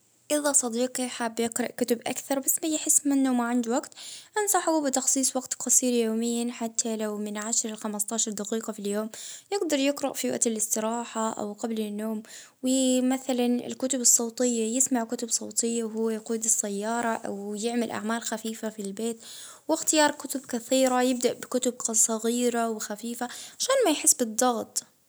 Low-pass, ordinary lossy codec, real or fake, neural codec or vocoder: none; none; real; none